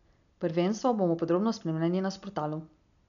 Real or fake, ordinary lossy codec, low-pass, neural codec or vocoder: real; none; 7.2 kHz; none